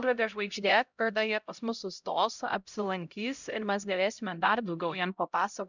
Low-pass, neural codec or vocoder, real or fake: 7.2 kHz; codec, 16 kHz, 0.5 kbps, X-Codec, HuBERT features, trained on LibriSpeech; fake